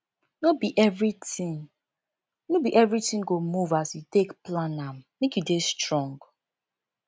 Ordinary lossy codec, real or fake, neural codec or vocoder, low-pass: none; real; none; none